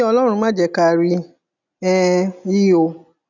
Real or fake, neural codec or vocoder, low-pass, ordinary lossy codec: real; none; 7.2 kHz; none